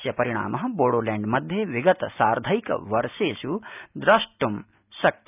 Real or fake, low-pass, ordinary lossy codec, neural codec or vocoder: real; 3.6 kHz; none; none